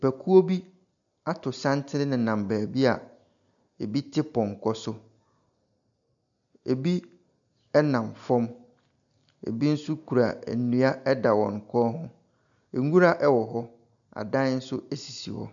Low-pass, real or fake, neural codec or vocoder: 7.2 kHz; real; none